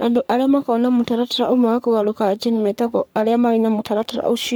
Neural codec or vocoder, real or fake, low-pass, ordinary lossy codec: codec, 44.1 kHz, 3.4 kbps, Pupu-Codec; fake; none; none